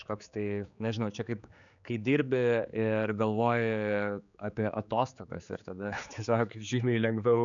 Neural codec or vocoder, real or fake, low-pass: codec, 16 kHz, 4 kbps, X-Codec, HuBERT features, trained on general audio; fake; 7.2 kHz